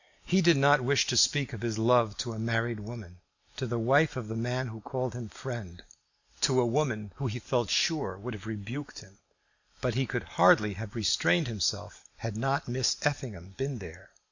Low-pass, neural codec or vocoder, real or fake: 7.2 kHz; none; real